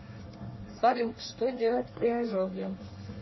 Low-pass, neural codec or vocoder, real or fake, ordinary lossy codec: 7.2 kHz; codec, 24 kHz, 1 kbps, SNAC; fake; MP3, 24 kbps